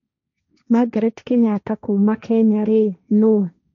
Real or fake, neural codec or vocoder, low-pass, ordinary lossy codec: fake; codec, 16 kHz, 1.1 kbps, Voila-Tokenizer; 7.2 kHz; none